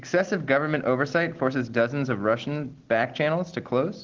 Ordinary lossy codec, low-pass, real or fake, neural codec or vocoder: Opus, 16 kbps; 7.2 kHz; real; none